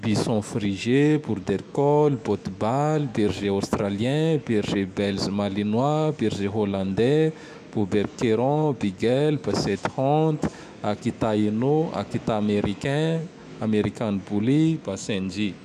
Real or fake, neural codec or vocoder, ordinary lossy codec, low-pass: fake; autoencoder, 48 kHz, 128 numbers a frame, DAC-VAE, trained on Japanese speech; none; 14.4 kHz